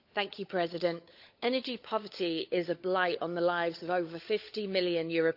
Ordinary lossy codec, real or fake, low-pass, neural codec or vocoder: AAC, 48 kbps; fake; 5.4 kHz; codec, 16 kHz, 16 kbps, FunCodec, trained on LibriTTS, 50 frames a second